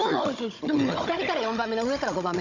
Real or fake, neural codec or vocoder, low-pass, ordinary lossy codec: fake; codec, 16 kHz, 16 kbps, FunCodec, trained on Chinese and English, 50 frames a second; 7.2 kHz; none